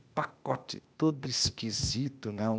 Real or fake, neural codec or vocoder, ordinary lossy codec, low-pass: fake; codec, 16 kHz, 0.8 kbps, ZipCodec; none; none